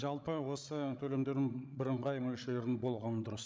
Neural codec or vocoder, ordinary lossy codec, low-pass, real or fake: codec, 16 kHz, 16 kbps, FreqCodec, larger model; none; none; fake